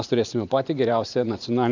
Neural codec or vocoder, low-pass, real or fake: vocoder, 44.1 kHz, 128 mel bands every 512 samples, BigVGAN v2; 7.2 kHz; fake